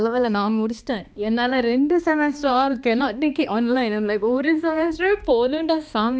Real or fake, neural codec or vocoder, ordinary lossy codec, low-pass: fake; codec, 16 kHz, 2 kbps, X-Codec, HuBERT features, trained on balanced general audio; none; none